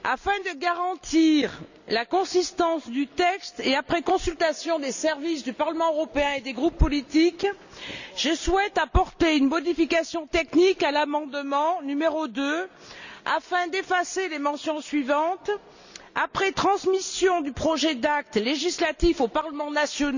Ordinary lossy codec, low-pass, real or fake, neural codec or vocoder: none; 7.2 kHz; real; none